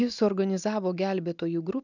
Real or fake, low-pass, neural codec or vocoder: real; 7.2 kHz; none